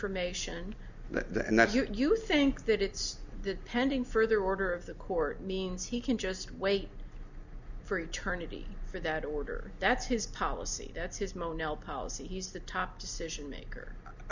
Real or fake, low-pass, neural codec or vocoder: real; 7.2 kHz; none